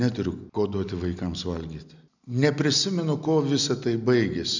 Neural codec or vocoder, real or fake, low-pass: none; real; 7.2 kHz